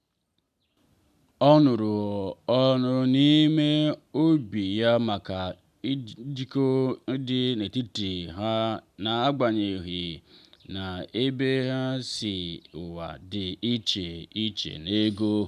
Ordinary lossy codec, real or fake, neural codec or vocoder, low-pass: none; real; none; 14.4 kHz